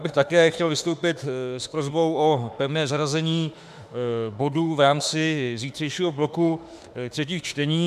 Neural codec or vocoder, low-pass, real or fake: autoencoder, 48 kHz, 32 numbers a frame, DAC-VAE, trained on Japanese speech; 14.4 kHz; fake